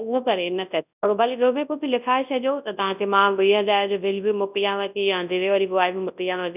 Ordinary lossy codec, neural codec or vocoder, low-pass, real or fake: none; codec, 24 kHz, 0.9 kbps, WavTokenizer, large speech release; 3.6 kHz; fake